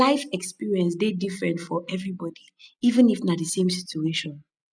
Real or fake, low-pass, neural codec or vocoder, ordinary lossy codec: real; 9.9 kHz; none; none